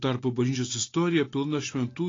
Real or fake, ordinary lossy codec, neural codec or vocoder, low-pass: real; AAC, 32 kbps; none; 7.2 kHz